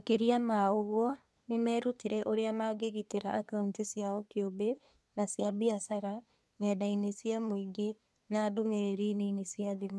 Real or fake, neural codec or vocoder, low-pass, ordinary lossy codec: fake; codec, 24 kHz, 1 kbps, SNAC; none; none